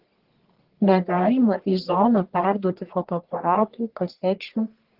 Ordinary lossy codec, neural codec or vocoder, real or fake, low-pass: Opus, 16 kbps; codec, 44.1 kHz, 1.7 kbps, Pupu-Codec; fake; 5.4 kHz